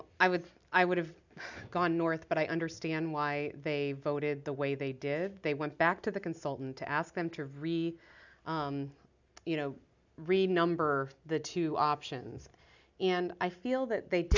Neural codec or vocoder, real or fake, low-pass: none; real; 7.2 kHz